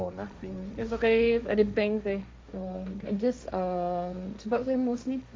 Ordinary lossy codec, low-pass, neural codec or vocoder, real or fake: none; none; codec, 16 kHz, 1.1 kbps, Voila-Tokenizer; fake